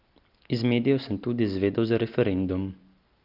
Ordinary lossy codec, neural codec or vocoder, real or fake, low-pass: Opus, 24 kbps; none; real; 5.4 kHz